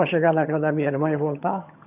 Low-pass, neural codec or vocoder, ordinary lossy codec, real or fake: 3.6 kHz; vocoder, 22.05 kHz, 80 mel bands, HiFi-GAN; none; fake